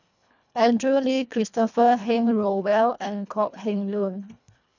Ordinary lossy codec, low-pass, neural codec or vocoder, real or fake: none; 7.2 kHz; codec, 24 kHz, 1.5 kbps, HILCodec; fake